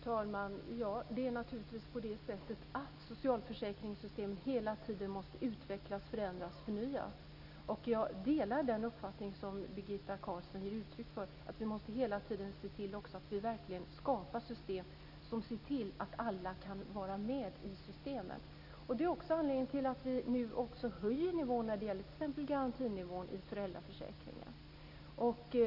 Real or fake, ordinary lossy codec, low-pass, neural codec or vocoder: real; none; 5.4 kHz; none